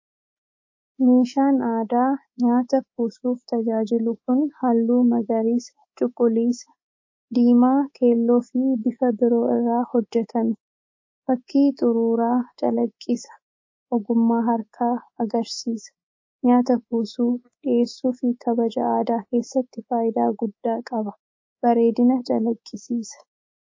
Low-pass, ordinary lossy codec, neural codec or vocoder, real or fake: 7.2 kHz; MP3, 32 kbps; codec, 24 kHz, 3.1 kbps, DualCodec; fake